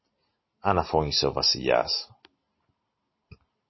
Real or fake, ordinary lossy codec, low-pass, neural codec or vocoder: real; MP3, 24 kbps; 7.2 kHz; none